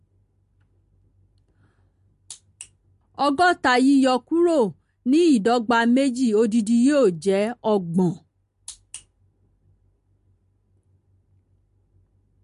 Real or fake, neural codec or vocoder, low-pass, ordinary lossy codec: real; none; 10.8 kHz; MP3, 48 kbps